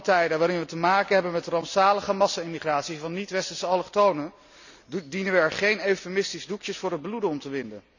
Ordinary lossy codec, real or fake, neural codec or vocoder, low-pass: none; real; none; 7.2 kHz